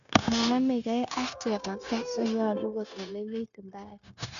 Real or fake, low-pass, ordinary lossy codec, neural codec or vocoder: fake; 7.2 kHz; none; codec, 16 kHz, 0.9 kbps, LongCat-Audio-Codec